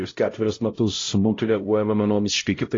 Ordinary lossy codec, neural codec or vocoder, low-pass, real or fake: AAC, 32 kbps; codec, 16 kHz, 0.5 kbps, X-Codec, HuBERT features, trained on LibriSpeech; 7.2 kHz; fake